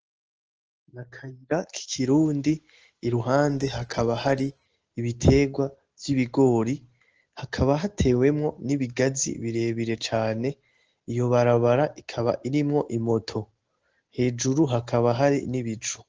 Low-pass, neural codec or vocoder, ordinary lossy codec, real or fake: 7.2 kHz; none; Opus, 16 kbps; real